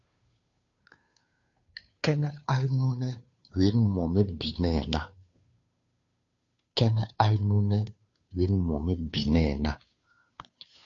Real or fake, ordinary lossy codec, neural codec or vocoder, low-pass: fake; AAC, 48 kbps; codec, 16 kHz, 2 kbps, FunCodec, trained on Chinese and English, 25 frames a second; 7.2 kHz